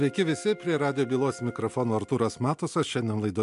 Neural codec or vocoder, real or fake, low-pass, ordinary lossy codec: none; real; 10.8 kHz; MP3, 64 kbps